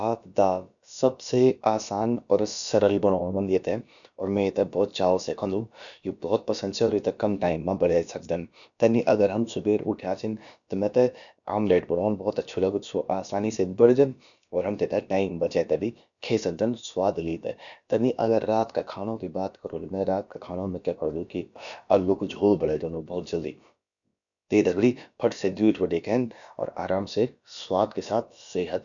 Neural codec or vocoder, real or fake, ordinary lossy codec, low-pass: codec, 16 kHz, about 1 kbps, DyCAST, with the encoder's durations; fake; none; 7.2 kHz